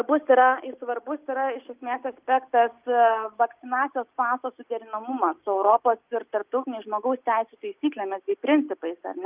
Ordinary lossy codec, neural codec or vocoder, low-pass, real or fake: Opus, 24 kbps; none; 3.6 kHz; real